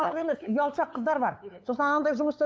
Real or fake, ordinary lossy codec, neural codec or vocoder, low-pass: fake; none; codec, 16 kHz, 8 kbps, FunCodec, trained on LibriTTS, 25 frames a second; none